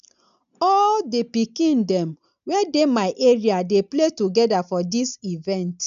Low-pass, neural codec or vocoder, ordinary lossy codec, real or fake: 7.2 kHz; none; none; real